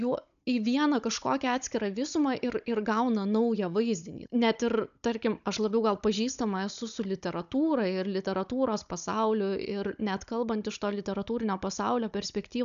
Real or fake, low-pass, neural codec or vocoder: fake; 7.2 kHz; codec, 16 kHz, 16 kbps, FunCodec, trained on Chinese and English, 50 frames a second